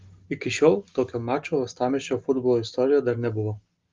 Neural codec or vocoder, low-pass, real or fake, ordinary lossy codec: none; 7.2 kHz; real; Opus, 32 kbps